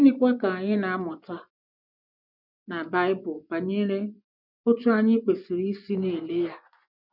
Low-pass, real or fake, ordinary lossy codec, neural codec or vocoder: 5.4 kHz; fake; none; vocoder, 24 kHz, 100 mel bands, Vocos